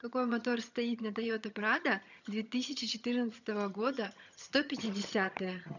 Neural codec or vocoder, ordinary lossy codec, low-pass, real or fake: vocoder, 22.05 kHz, 80 mel bands, HiFi-GAN; none; 7.2 kHz; fake